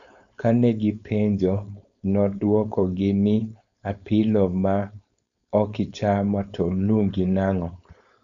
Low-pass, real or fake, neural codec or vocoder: 7.2 kHz; fake; codec, 16 kHz, 4.8 kbps, FACodec